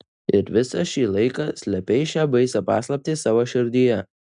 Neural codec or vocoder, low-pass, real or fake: none; 9.9 kHz; real